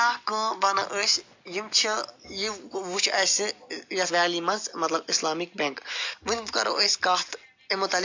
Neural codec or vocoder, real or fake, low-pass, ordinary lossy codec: none; real; 7.2 kHz; AAC, 48 kbps